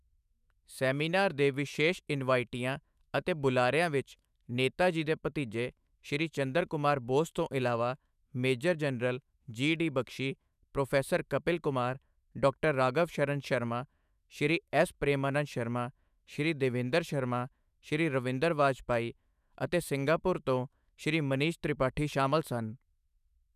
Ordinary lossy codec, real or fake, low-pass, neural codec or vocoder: none; fake; 14.4 kHz; autoencoder, 48 kHz, 128 numbers a frame, DAC-VAE, trained on Japanese speech